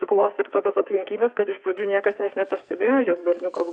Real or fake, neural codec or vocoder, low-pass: fake; codec, 44.1 kHz, 2.6 kbps, SNAC; 9.9 kHz